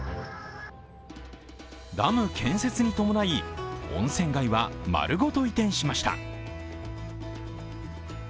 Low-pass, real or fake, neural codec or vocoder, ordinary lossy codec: none; real; none; none